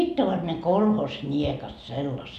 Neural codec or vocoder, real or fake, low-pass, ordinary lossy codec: none; real; 14.4 kHz; none